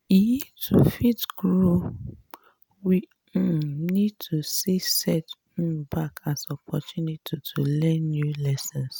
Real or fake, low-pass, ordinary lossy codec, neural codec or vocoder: real; none; none; none